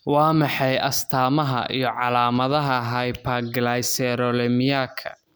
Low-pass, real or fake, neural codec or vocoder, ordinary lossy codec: none; real; none; none